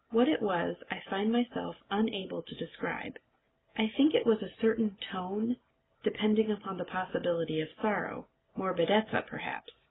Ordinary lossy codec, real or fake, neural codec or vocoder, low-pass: AAC, 16 kbps; real; none; 7.2 kHz